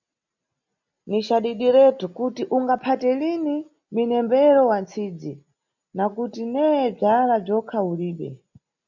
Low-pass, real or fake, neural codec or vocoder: 7.2 kHz; real; none